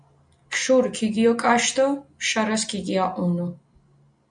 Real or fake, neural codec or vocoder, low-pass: real; none; 9.9 kHz